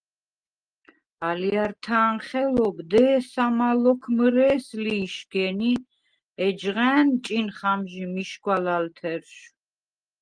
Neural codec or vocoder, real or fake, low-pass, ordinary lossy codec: none; real; 9.9 kHz; Opus, 24 kbps